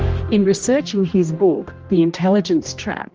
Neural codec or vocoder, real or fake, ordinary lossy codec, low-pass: codec, 16 kHz, 1 kbps, X-Codec, HuBERT features, trained on general audio; fake; Opus, 24 kbps; 7.2 kHz